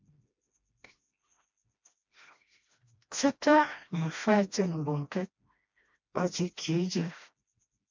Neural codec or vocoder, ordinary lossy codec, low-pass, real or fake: codec, 16 kHz, 1 kbps, FreqCodec, smaller model; MP3, 64 kbps; 7.2 kHz; fake